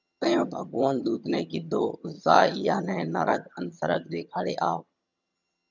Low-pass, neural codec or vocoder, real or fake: 7.2 kHz; vocoder, 22.05 kHz, 80 mel bands, HiFi-GAN; fake